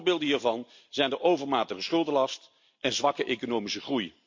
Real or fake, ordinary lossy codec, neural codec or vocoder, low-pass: real; MP3, 48 kbps; none; 7.2 kHz